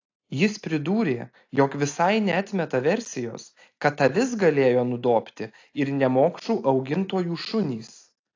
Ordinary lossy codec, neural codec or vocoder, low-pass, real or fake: AAC, 48 kbps; none; 7.2 kHz; real